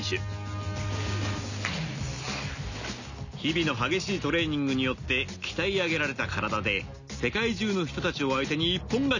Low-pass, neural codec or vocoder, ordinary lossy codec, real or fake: 7.2 kHz; none; none; real